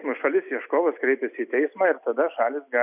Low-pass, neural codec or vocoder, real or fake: 3.6 kHz; none; real